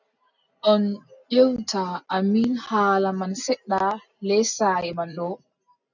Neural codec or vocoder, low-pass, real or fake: none; 7.2 kHz; real